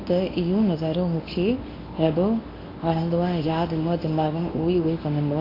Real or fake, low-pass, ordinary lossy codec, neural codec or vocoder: fake; 5.4 kHz; AAC, 24 kbps; codec, 24 kHz, 0.9 kbps, WavTokenizer, medium speech release version 1